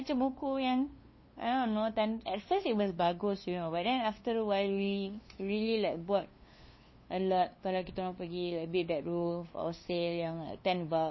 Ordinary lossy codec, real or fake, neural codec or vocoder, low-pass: MP3, 24 kbps; fake; codec, 16 kHz, 2 kbps, FunCodec, trained on LibriTTS, 25 frames a second; 7.2 kHz